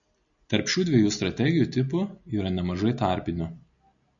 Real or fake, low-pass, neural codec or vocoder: real; 7.2 kHz; none